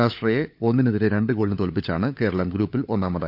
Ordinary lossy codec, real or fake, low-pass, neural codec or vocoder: none; fake; 5.4 kHz; codec, 16 kHz, 16 kbps, FunCodec, trained on LibriTTS, 50 frames a second